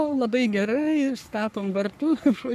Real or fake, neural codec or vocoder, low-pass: fake; codec, 44.1 kHz, 3.4 kbps, Pupu-Codec; 14.4 kHz